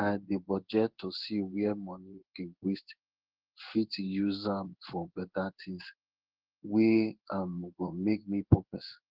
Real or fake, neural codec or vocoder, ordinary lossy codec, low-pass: fake; codec, 16 kHz in and 24 kHz out, 1 kbps, XY-Tokenizer; Opus, 16 kbps; 5.4 kHz